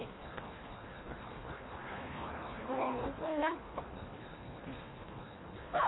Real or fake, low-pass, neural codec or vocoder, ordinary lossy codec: fake; 7.2 kHz; codec, 24 kHz, 1.5 kbps, HILCodec; AAC, 16 kbps